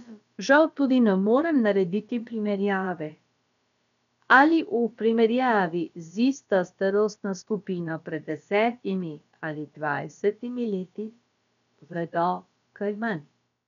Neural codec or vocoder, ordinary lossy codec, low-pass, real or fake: codec, 16 kHz, about 1 kbps, DyCAST, with the encoder's durations; none; 7.2 kHz; fake